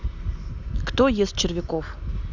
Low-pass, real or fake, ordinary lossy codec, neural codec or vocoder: 7.2 kHz; real; none; none